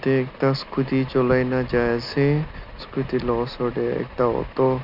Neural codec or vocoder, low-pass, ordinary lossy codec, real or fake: none; 5.4 kHz; none; real